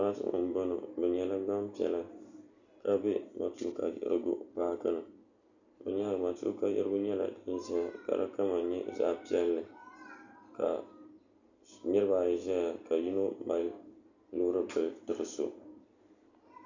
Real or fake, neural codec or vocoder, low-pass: real; none; 7.2 kHz